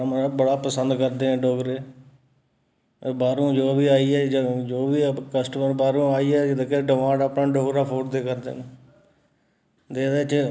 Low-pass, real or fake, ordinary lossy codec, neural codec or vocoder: none; real; none; none